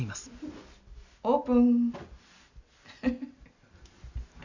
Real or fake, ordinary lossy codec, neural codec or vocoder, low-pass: real; none; none; 7.2 kHz